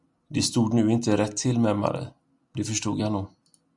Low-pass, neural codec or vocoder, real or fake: 10.8 kHz; none; real